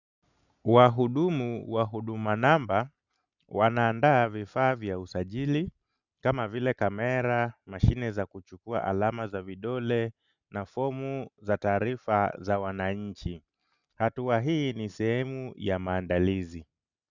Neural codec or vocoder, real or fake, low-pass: none; real; 7.2 kHz